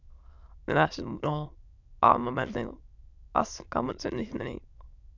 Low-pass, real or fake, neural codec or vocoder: 7.2 kHz; fake; autoencoder, 22.05 kHz, a latent of 192 numbers a frame, VITS, trained on many speakers